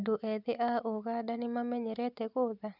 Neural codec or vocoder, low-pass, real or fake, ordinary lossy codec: none; 5.4 kHz; real; none